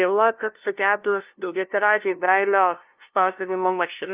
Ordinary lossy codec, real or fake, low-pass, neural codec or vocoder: Opus, 24 kbps; fake; 3.6 kHz; codec, 16 kHz, 0.5 kbps, FunCodec, trained on LibriTTS, 25 frames a second